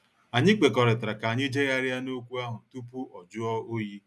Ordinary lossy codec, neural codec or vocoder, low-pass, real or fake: none; none; none; real